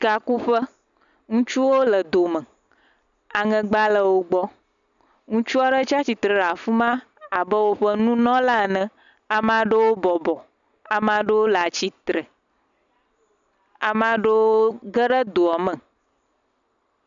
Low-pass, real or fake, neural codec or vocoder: 7.2 kHz; real; none